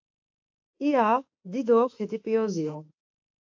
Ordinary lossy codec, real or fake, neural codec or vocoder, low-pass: AAC, 48 kbps; fake; autoencoder, 48 kHz, 32 numbers a frame, DAC-VAE, trained on Japanese speech; 7.2 kHz